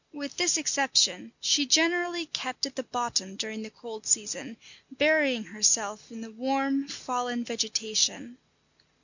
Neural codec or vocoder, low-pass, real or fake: none; 7.2 kHz; real